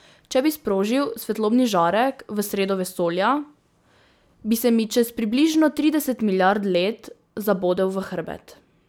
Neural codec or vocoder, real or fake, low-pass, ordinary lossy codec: none; real; none; none